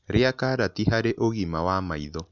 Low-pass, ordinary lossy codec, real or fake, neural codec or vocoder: 7.2 kHz; none; real; none